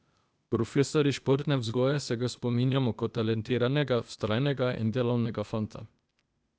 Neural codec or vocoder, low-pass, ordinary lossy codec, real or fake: codec, 16 kHz, 0.8 kbps, ZipCodec; none; none; fake